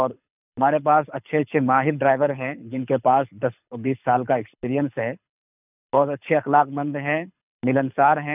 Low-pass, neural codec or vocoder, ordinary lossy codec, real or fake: 3.6 kHz; codec, 24 kHz, 6 kbps, HILCodec; none; fake